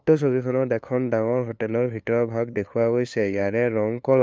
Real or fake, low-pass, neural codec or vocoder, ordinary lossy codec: fake; none; codec, 16 kHz, 2 kbps, FunCodec, trained on LibriTTS, 25 frames a second; none